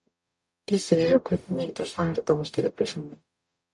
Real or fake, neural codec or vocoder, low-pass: fake; codec, 44.1 kHz, 0.9 kbps, DAC; 10.8 kHz